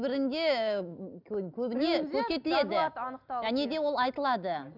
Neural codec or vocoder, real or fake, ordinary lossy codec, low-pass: none; real; none; 5.4 kHz